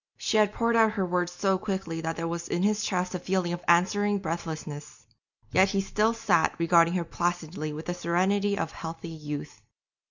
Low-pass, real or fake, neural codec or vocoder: 7.2 kHz; real; none